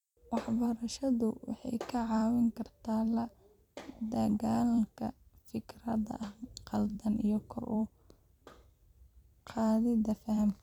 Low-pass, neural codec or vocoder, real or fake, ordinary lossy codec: 19.8 kHz; vocoder, 44.1 kHz, 128 mel bands every 256 samples, BigVGAN v2; fake; none